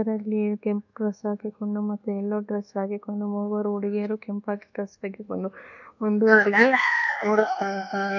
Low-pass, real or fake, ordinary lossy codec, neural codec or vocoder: 7.2 kHz; fake; none; codec, 24 kHz, 1.2 kbps, DualCodec